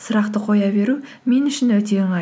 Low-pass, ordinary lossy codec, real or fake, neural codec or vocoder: none; none; real; none